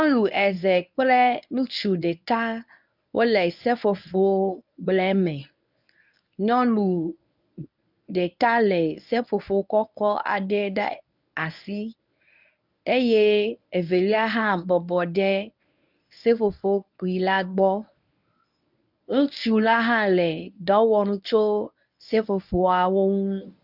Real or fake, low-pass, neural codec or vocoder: fake; 5.4 kHz; codec, 24 kHz, 0.9 kbps, WavTokenizer, medium speech release version 1